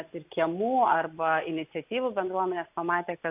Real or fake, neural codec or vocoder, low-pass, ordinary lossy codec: real; none; 3.6 kHz; AAC, 32 kbps